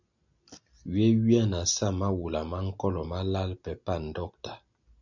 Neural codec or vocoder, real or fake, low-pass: none; real; 7.2 kHz